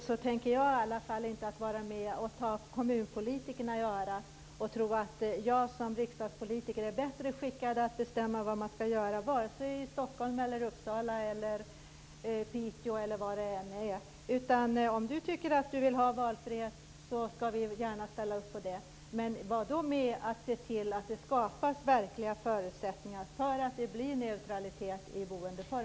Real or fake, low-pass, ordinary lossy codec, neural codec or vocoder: real; none; none; none